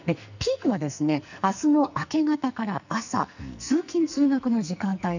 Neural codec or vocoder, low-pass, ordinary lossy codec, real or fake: codec, 44.1 kHz, 2.6 kbps, SNAC; 7.2 kHz; none; fake